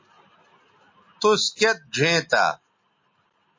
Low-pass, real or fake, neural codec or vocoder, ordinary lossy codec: 7.2 kHz; real; none; MP3, 32 kbps